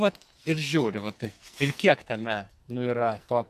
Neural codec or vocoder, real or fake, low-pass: codec, 44.1 kHz, 2.6 kbps, SNAC; fake; 14.4 kHz